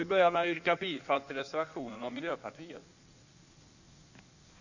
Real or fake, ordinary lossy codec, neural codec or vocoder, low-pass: fake; none; codec, 16 kHz in and 24 kHz out, 1.1 kbps, FireRedTTS-2 codec; 7.2 kHz